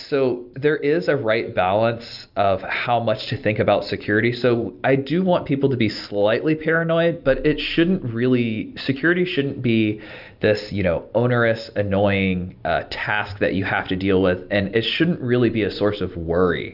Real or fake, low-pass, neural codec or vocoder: real; 5.4 kHz; none